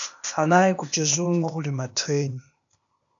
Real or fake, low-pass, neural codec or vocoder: fake; 7.2 kHz; codec, 16 kHz, 0.8 kbps, ZipCodec